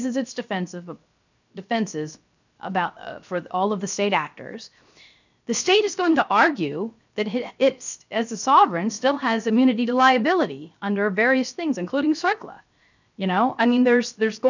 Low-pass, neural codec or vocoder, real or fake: 7.2 kHz; codec, 16 kHz, 0.7 kbps, FocalCodec; fake